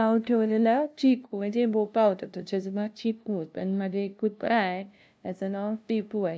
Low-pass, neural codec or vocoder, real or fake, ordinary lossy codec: none; codec, 16 kHz, 0.5 kbps, FunCodec, trained on LibriTTS, 25 frames a second; fake; none